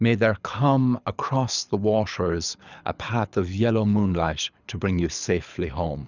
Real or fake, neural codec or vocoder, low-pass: fake; codec, 24 kHz, 6 kbps, HILCodec; 7.2 kHz